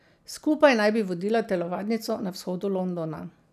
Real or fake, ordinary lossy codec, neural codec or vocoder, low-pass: real; none; none; 14.4 kHz